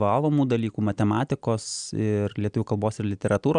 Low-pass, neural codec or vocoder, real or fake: 9.9 kHz; none; real